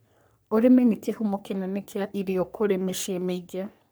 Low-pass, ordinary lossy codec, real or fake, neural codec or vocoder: none; none; fake; codec, 44.1 kHz, 3.4 kbps, Pupu-Codec